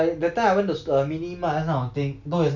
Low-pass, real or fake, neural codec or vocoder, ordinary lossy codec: 7.2 kHz; real; none; none